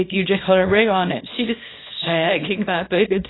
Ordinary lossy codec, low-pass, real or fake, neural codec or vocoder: AAC, 16 kbps; 7.2 kHz; fake; codec, 24 kHz, 0.9 kbps, WavTokenizer, small release